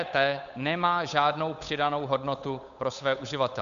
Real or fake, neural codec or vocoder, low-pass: fake; codec, 16 kHz, 8 kbps, FunCodec, trained on Chinese and English, 25 frames a second; 7.2 kHz